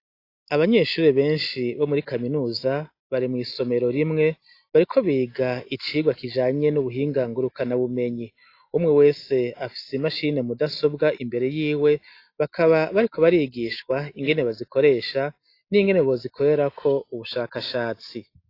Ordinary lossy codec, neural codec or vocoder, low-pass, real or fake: AAC, 32 kbps; none; 5.4 kHz; real